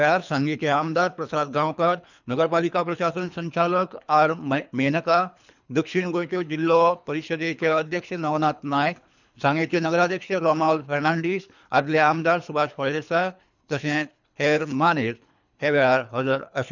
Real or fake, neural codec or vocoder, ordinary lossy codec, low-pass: fake; codec, 24 kHz, 3 kbps, HILCodec; none; 7.2 kHz